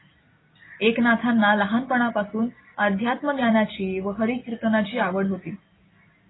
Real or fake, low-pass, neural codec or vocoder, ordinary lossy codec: real; 7.2 kHz; none; AAC, 16 kbps